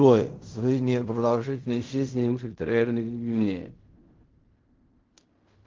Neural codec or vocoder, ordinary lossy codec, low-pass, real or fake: codec, 16 kHz in and 24 kHz out, 0.4 kbps, LongCat-Audio-Codec, fine tuned four codebook decoder; Opus, 32 kbps; 7.2 kHz; fake